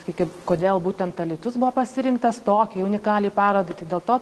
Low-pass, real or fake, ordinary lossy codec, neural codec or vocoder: 10.8 kHz; real; Opus, 16 kbps; none